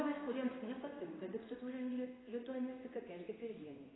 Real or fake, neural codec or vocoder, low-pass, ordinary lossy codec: fake; codec, 16 kHz in and 24 kHz out, 1 kbps, XY-Tokenizer; 7.2 kHz; AAC, 16 kbps